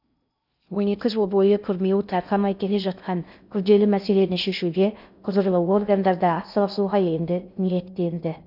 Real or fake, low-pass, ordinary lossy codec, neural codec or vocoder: fake; 5.4 kHz; none; codec, 16 kHz in and 24 kHz out, 0.6 kbps, FocalCodec, streaming, 2048 codes